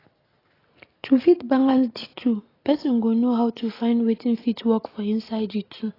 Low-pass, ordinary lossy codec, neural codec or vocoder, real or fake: 5.4 kHz; AAC, 24 kbps; none; real